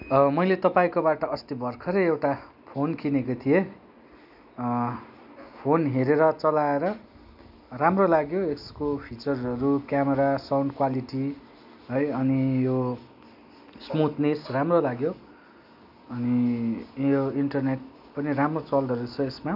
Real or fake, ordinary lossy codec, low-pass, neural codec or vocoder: real; none; 5.4 kHz; none